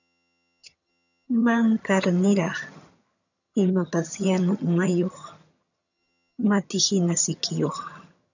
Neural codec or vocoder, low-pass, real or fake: vocoder, 22.05 kHz, 80 mel bands, HiFi-GAN; 7.2 kHz; fake